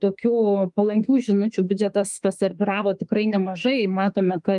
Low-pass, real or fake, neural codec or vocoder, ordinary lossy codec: 10.8 kHz; fake; autoencoder, 48 kHz, 32 numbers a frame, DAC-VAE, trained on Japanese speech; Opus, 32 kbps